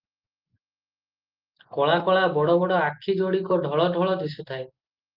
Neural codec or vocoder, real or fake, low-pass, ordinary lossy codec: none; real; 5.4 kHz; Opus, 32 kbps